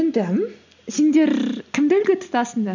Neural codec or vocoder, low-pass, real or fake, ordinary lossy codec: none; 7.2 kHz; real; none